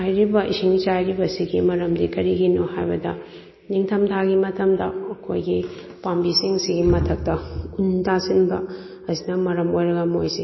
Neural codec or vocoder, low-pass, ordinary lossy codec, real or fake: none; 7.2 kHz; MP3, 24 kbps; real